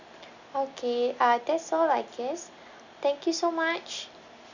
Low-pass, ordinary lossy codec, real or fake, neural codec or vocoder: 7.2 kHz; none; real; none